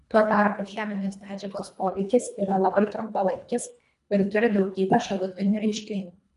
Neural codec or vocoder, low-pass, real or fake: codec, 24 kHz, 1.5 kbps, HILCodec; 10.8 kHz; fake